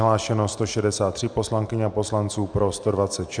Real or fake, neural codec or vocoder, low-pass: real; none; 9.9 kHz